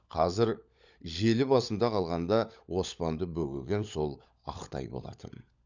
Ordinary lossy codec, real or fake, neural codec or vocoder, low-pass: none; fake; codec, 16 kHz, 16 kbps, FunCodec, trained on LibriTTS, 50 frames a second; 7.2 kHz